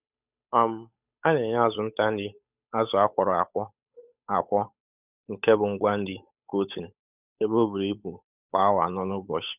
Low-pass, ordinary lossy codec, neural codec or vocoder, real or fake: 3.6 kHz; none; codec, 16 kHz, 8 kbps, FunCodec, trained on Chinese and English, 25 frames a second; fake